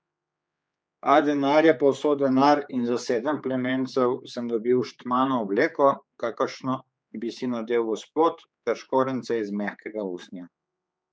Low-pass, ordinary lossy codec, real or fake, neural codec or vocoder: none; none; fake; codec, 16 kHz, 4 kbps, X-Codec, HuBERT features, trained on general audio